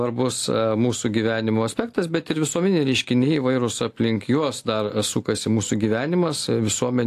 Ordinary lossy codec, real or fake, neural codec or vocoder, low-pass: AAC, 64 kbps; real; none; 14.4 kHz